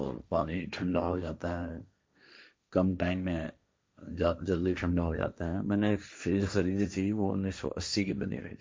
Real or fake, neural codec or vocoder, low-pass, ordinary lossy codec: fake; codec, 16 kHz, 1.1 kbps, Voila-Tokenizer; none; none